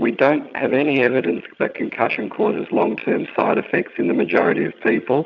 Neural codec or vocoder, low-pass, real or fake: vocoder, 22.05 kHz, 80 mel bands, HiFi-GAN; 7.2 kHz; fake